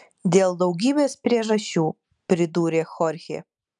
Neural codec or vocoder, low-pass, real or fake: none; 10.8 kHz; real